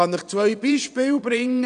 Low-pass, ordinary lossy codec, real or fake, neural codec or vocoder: 9.9 kHz; none; fake; vocoder, 22.05 kHz, 80 mel bands, WaveNeXt